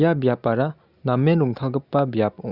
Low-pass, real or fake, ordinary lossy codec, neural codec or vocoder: 5.4 kHz; real; Opus, 64 kbps; none